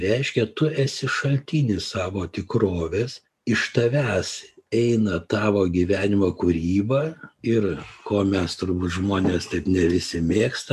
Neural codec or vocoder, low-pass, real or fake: none; 14.4 kHz; real